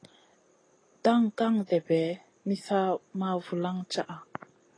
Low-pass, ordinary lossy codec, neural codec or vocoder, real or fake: 9.9 kHz; AAC, 32 kbps; none; real